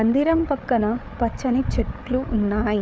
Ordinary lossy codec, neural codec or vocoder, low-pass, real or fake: none; codec, 16 kHz, 8 kbps, FreqCodec, larger model; none; fake